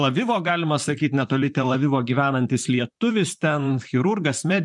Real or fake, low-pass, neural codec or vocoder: fake; 10.8 kHz; vocoder, 44.1 kHz, 128 mel bands, Pupu-Vocoder